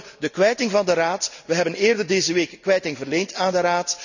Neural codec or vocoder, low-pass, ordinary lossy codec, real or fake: none; 7.2 kHz; none; real